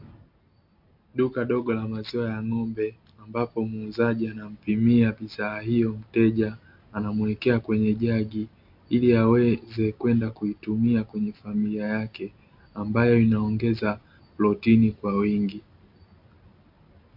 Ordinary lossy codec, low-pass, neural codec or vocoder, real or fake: MP3, 48 kbps; 5.4 kHz; none; real